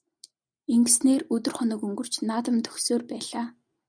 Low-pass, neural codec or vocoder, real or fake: 9.9 kHz; none; real